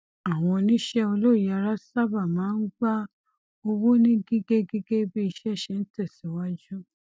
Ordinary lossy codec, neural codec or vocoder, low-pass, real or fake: none; none; none; real